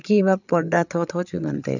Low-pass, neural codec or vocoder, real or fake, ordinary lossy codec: 7.2 kHz; codec, 16 kHz, 8 kbps, FreqCodec, larger model; fake; none